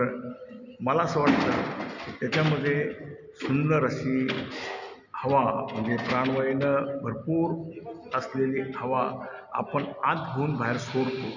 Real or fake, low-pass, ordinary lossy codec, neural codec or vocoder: real; 7.2 kHz; none; none